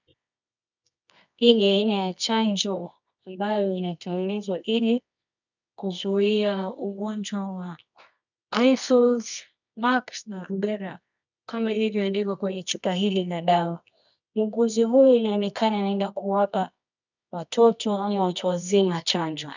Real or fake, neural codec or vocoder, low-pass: fake; codec, 24 kHz, 0.9 kbps, WavTokenizer, medium music audio release; 7.2 kHz